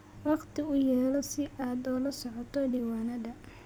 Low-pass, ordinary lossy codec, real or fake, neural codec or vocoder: none; none; fake; vocoder, 44.1 kHz, 128 mel bands every 256 samples, BigVGAN v2